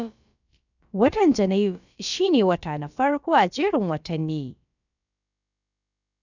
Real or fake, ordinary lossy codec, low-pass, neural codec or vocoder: fake; none; 7.2 kHz; codec, 16 kHz, about 1 kbps, DyCAST, with the encoder's durations